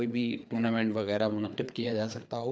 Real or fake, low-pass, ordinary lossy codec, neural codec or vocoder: fake; none; none; codec, 16 kHz, 4 kbps, FreqCodec, larger model